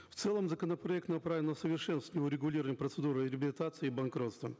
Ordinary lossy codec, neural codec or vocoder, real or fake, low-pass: none; none; real; none